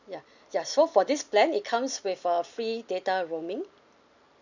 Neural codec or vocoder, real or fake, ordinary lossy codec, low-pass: none; real; none; 7.2 kHz